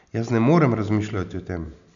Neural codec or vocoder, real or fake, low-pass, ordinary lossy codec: none; real; 7.2 kHz; none